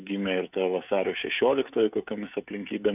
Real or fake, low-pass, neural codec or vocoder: fake; 3.6 kHz; codec, 16 kHz, 16 kbps, FreqCodec, smaller model